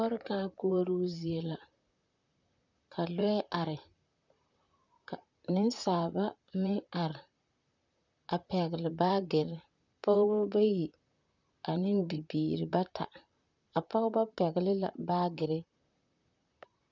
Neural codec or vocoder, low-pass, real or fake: vocoder, 44.1 kHz, 128 mel bands, Pupu-Vocoder; 7.2 kHz; fake